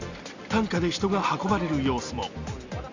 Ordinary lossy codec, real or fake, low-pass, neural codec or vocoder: Opus, 64 kbps; real; 7.2 kHz; none